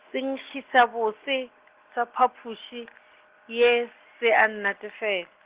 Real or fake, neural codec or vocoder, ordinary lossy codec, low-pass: real; none; Opus, 16 kbps; 3.6 kHz